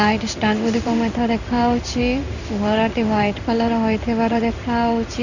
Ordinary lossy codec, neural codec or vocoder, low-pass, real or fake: none; codec, 16 kHz in and 24 kHz out, 1 kbps, XY-Tokenizer; 7.2 kHz; fake